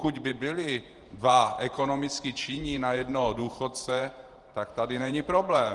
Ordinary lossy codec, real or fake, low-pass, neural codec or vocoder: Opus, 24 kbps; fake; 10.8 kHz; vocoder, 48 kHz, 128 mel bands, Vocos